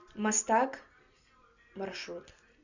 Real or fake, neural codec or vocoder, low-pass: real; none; 7.2 kHz